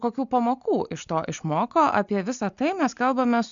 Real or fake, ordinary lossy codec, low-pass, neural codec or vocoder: real; MP3, 96 kbps; 7.2 kHz; none